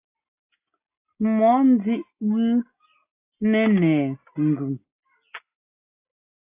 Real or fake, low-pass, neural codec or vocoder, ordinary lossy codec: real; 3.6 kHz; none; AAC, 32 kbps